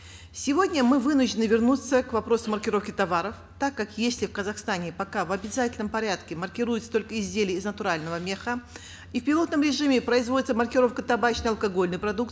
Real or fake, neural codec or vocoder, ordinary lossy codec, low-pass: real; none; none; none